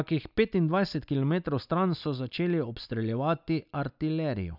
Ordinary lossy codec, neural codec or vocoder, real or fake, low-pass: none; none; real; 5.4 kHz